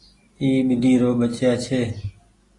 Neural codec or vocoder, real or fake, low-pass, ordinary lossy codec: none; real; 10.8 kHz; AAC, 32 kbps